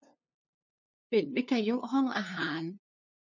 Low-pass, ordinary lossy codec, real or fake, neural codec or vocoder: 7.2 kHz; none; fake; codec, 16 kHz, 2 kbps, FunCodec, trained on LibriTTS, 25 frames a second